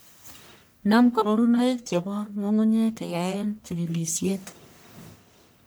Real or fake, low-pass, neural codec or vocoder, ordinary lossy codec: fake; none; codec, 44.1 kHz, 1.7 kbps, Pupu-Codec; none